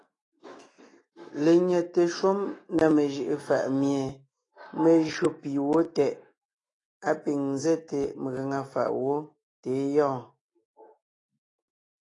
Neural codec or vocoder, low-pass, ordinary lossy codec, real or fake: autoencoder, 48 kHz, 128 numbers a frame, DAC-VAE, trained on Japanese speech; 10.8 kHz; AAC, 32 kbps; fake